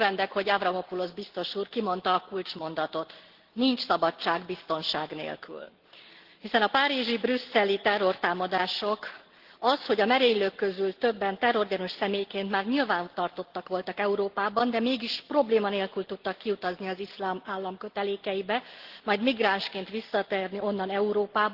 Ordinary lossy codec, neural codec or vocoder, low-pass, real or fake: Opus, 16 kbps; none; 5.4 kHz; real